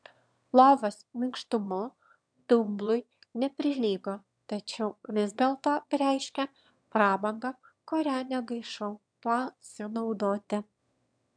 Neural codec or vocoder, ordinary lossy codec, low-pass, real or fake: autoencoder, 22.05 kHz, a latent of 192 numbers a frame, VITS, trained on one speaker; MP3, 96 kbps; 9.9 kHz; fake